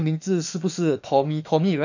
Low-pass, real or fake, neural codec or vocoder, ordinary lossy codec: 7.2 kHz; fake; autoencoder, 48 kHz, 32 numbers a frame, DAC-VAE, trained on Japanese speech; none